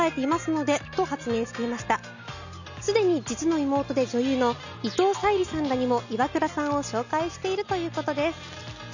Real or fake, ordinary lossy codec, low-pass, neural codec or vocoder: real; none; 7.2 kHz; none